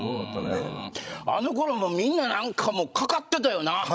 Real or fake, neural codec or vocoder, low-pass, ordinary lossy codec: fake; codec, 16 kHz, 16 kbps, FreqCodec, larger model; none; none